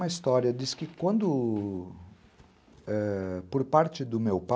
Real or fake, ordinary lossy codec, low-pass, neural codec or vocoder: real; none; none; none